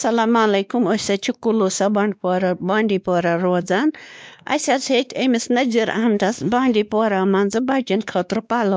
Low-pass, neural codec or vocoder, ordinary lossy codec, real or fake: none; codec, 16 kHz, 2 kbps, X-Codec, WavLM features, trained on Multilingual LibriSpeech; none; fake